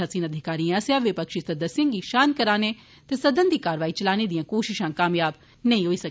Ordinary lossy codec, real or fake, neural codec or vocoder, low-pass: none; real; none; none